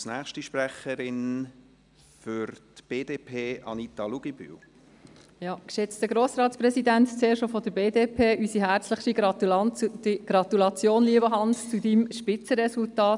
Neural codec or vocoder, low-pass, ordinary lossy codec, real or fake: none; 10.8 kHz; none; real